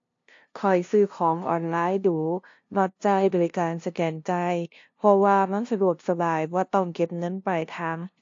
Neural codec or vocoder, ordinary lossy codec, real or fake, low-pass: codec, 16 kHz, 0.5 kbps, FunCodec, trained on LibriTTS, 25 frames a second; AAC, 48 kbps; fake; 7.2 kHz